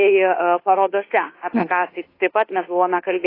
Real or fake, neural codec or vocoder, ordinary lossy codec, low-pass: fake; codec, 24 kHz, 1.2 kbps, DualCodec; AAC, 24 kbps; 5.4 kHz